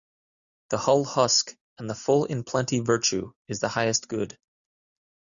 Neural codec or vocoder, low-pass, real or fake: none; 7.2 kHz; real